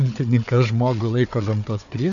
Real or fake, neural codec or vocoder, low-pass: fake; codec, 16 kHz, 4 kbps, FunCodec, trained on Chinese and English, 50 frames a second; 7.2 kHz